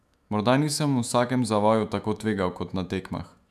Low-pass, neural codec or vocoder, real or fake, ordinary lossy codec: 14.4 kHz; autoencoder, 48 kHz, 128 numbers a frame, DAC-VAE, trained on Japanese speech; fake; none